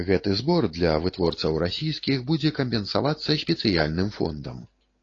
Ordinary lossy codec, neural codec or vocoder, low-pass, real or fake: AAC, 32 kbps; none; 7.2 kHz; real